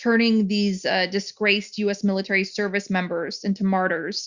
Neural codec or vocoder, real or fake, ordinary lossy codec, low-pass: none; real; Opus, 64 kbps; 7.2 kHz